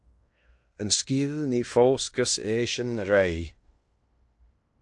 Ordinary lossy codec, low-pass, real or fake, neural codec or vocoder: AAC, 64 kbps; 10.8 kHz; fake; codec, 16 kHz in and 24 kHz out, 0.9 kbps, LongCat-Audio-Codec, fine tuned four codebook decoder